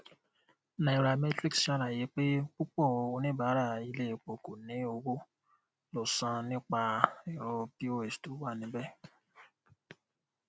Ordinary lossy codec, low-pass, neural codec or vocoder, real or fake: none; none; none; real